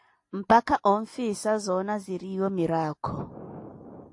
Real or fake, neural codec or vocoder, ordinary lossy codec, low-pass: real; none; AAC, 48 kbps; 10.8 kHz